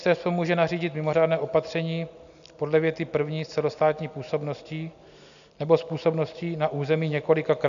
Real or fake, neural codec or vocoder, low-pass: real; none; 7.2 kHz